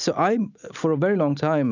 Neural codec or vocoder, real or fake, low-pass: none; real; 7.2 kHz